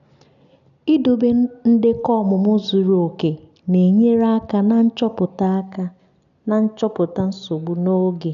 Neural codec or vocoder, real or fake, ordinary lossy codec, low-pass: none; real; none; 7.2 kHz